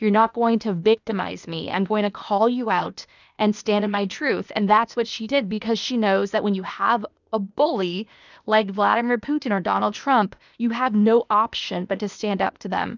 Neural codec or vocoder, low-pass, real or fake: codec, 16 kHz, 0.8 kbps, ZipCodec; 7.2 kHz; fake